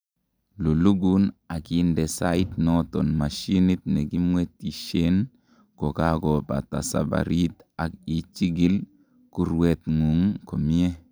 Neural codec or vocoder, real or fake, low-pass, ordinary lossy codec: none; real; none; none